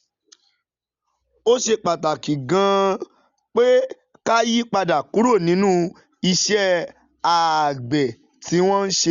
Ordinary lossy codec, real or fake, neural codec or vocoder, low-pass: Opus, 64 kbps; real; none; 7.2 kHz